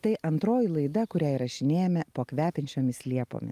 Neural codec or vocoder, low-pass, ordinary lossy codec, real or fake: none; 14.4 kHz; Opus, 32 kbps; real